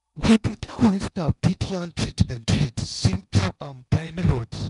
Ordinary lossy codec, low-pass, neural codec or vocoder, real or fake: MP3, 96 kbps; 10.8 kHz; codec, 16 kHz in and 24 kHz out, 0.8 kbps, FocalCodec, streaming, 65536 codes; fake